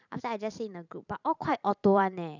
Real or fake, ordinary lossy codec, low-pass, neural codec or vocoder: fake; none; 7.2 kHz; vocoder, 44.1 kHz, 128 mel bands every 512 samples, BigVGAN v2